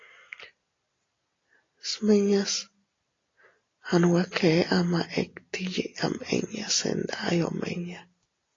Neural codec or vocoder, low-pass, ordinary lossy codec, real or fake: none; 7.2 kHz; AAC, 32 kbps; real